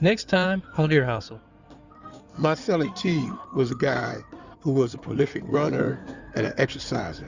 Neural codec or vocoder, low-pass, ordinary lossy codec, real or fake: codec, 16 kHz in and 24 kHz out, 2.2 kbps, FireRedTTS-2 codec; 7.2 kHz; Opus, 64 kbps; fake